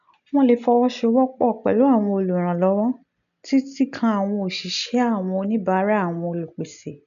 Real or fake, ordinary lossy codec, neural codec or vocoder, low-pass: real; none; none; 7.2 kHz